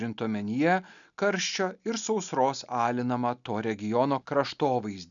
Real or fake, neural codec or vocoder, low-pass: real; none; 7.2 kHz